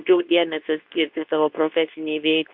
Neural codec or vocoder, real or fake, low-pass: codec, 24 kHz, 0.9 kbps, WavTokenizer, medium speech release version 2; fake; 5.4 kHz